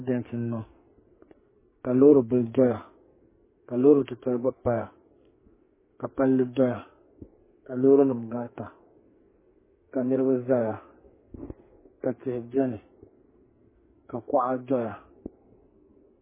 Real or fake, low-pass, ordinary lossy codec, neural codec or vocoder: fake; 3.6 kHz; MP3, 16 kbps; codec, 44.1 kHz, 2.6 kbps, SNAC